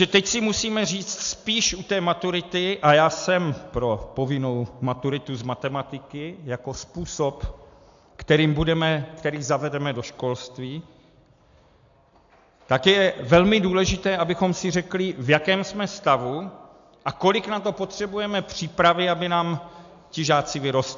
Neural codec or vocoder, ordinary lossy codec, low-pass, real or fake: none; AAC, 64 kbps; 7.2 kHz; real